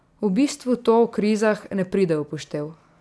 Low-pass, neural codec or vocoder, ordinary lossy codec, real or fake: none; none; none; real